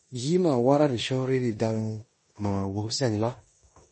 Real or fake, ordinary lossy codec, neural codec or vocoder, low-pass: fake; MP3, 32 kbps; codec, 16 kHz in and 24 kHz out, 0.9 kbps, LongCat-Audio-Codec, four codebook decoder; 10.8 kHz